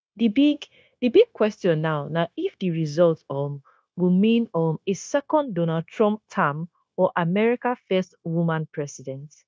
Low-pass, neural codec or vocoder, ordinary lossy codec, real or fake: none; codec, 16 kHz, 0.9 kbps, LongCat-Audio-Codec; none; fake